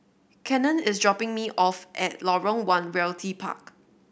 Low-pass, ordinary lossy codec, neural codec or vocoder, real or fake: none; none; none; real